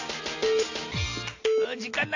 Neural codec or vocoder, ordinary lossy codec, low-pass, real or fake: none; AAC, 48 kbps; 7.2 kHz; real